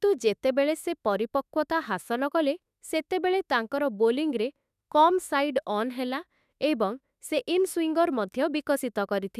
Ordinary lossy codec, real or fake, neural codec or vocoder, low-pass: none; fake; autoencoder, 48 kHz, 32 numbers a frame, DAC-VAE, trained on Japanese speech; 14.4 kHz